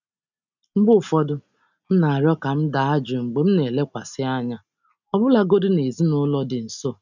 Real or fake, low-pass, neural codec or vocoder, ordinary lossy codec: real; 7.2 kHz; none; none